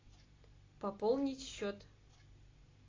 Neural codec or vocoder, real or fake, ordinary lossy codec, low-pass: none; real; AAC, 32 kbps; 7.2 kHz